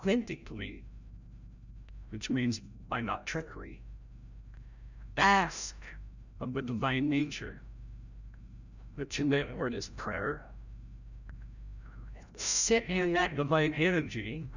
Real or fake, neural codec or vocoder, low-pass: fake; codec, 16 kHz, 0.5 kbps, FreqCodec, larger model; 7.2 kHz